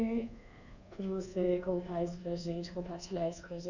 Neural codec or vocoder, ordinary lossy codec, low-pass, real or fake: codec, 24 kHz, 1.2 kbps, DualCodec; none; 7.2 kHz; fake